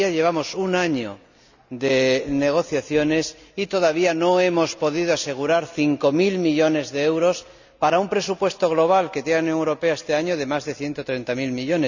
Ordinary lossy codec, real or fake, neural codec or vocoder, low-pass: none; real; none; 7.2 kHz